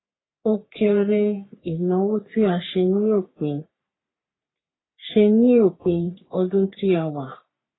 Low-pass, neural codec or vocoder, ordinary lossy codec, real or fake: 7.2 kHz; codec, 44.1 kHz, 3.4 kbps, Pupu-Codec; AAC, 16 kbps; fake